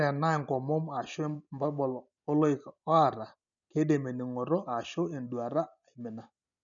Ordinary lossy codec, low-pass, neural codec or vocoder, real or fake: none; 7.2 kHz; none; real